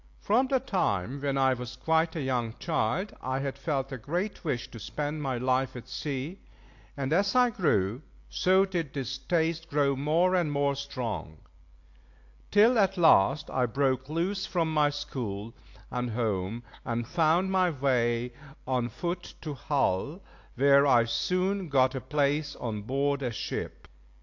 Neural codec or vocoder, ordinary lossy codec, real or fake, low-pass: none; AAC, 48 kbps; real; 7.2 kHz